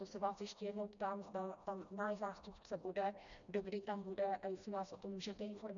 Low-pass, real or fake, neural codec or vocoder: 7.2 kHz; fake; codec, 16 kHz, 1 kbps, FreqCodec, smaller model